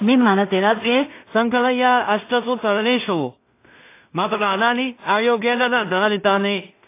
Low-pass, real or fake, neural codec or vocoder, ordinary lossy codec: 3.6 kHz; fake; codec, 16 kHz in and 24 kHz out, 0.4 kbps, LongCat-Audio-Codec, two codebook decoder; AAC, 24 kbps